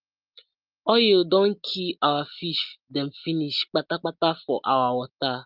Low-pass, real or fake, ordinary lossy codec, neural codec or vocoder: 5.4 kHz; real; Opus, 32 kbps; none